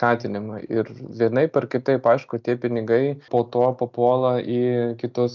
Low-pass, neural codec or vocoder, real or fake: 7.2 kHz; none; real